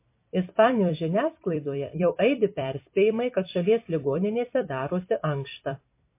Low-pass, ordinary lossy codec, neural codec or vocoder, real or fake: 3.6 kHz; MP3, 24 kbps; vocoder, 44.1 kHz, 128 mel bands every 256 samples, BigVGAN v2; fake